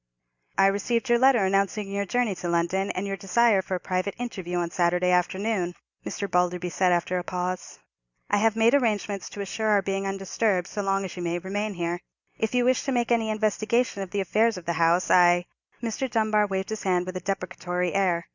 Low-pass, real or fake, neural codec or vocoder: 7.2 kHz; real; none